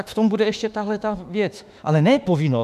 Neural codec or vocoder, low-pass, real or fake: autoencoder, 48 kHz, 32 numbers a frame, DAC-VAE, trained on Japanese speech; 14.4 kHz; fake